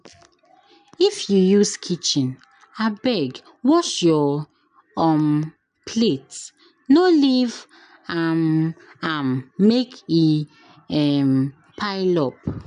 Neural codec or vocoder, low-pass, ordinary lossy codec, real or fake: none; 9.9 kHz; none; real